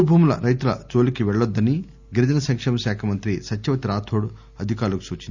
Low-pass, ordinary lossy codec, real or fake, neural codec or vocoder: 7.2 kHz; Opus, 64 kbps; real; none